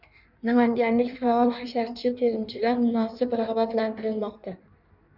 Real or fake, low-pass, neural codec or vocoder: fake; 5.4 kHz; codec, 16 kHz in and 24 kHz out, 1.1 kbps, FireRedTTS-2 codec